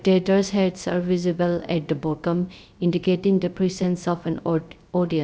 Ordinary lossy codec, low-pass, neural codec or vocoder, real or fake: none; none; codec, 16 kHz, 0.3 kbps, FocalCodec; fake